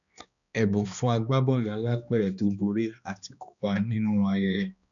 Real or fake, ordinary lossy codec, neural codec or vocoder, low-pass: fake; none; codec, 16 kHz, 2 kbps, X-Codec, HuBERT features, trained on balanced general audio; 7.2 kHz